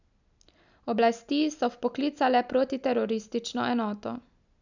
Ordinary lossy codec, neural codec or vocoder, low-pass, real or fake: none; none; 7.2 kHz; real